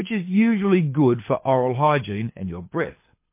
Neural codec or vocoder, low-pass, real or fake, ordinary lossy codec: codec, 24 kHz, 6 kbps, HILCodec; 3.6 kHz; fake; MP3, 24 kbps